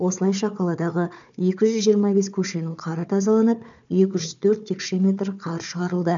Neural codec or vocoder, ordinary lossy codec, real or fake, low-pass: codec, 16 kHz, 4 kbps, FunCodec, trained on Chinese and English, 50 frames a second; none; fake; 7.2 kHz